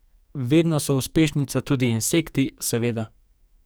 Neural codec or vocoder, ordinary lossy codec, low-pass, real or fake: codec, 44.1 kHz, 2.6 kbps, SNAC; none; none; fake